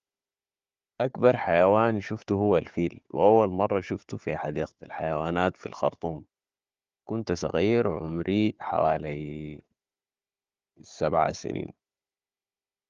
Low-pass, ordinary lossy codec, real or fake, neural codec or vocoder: 7.2 kHz; Opus, 32 kbps; fake; codec, 16 kHz, 4 kbps, FunCodec, trained on Chinese and English, 50 frames a second